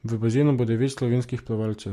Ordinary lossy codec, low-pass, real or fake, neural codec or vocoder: MP3, 64 kbps; 14.4 kHz; real; none